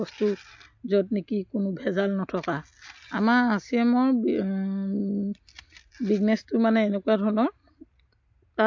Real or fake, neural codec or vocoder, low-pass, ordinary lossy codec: real; none; 7.2 kHz; MP3, 48 kbps